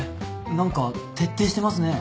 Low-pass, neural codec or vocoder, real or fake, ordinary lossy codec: none; none; real; none